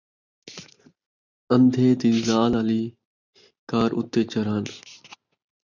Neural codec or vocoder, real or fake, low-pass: none; real; 7.2 kHz